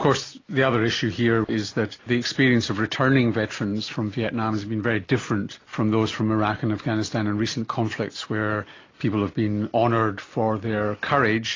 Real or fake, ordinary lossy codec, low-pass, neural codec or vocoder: real; AAC, 32 kbps; 7.2 kHz; none